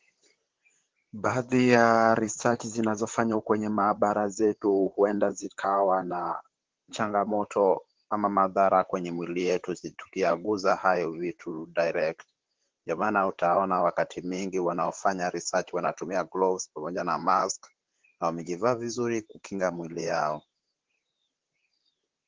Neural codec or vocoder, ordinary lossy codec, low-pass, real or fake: vocoder, 44.1 kHz, 128 mel bands, Pupu-Vocoder; Opus, 16 kbps; 7.2 kHz; fake